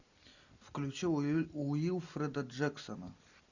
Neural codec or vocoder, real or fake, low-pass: none; real; 7.2 kHz